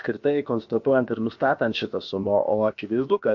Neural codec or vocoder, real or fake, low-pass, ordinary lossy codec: codec, 16 kHz, about 1 kbps, DyCAST, with the encoder's durations; fake; 7.2 kHz; MP3, 48 kbps